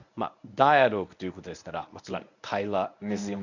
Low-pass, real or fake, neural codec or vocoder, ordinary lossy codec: 7.2 kHz; fake; codec, 24 kHz, 0.9 kbps, WavTokenizer, medium speech release version 1; none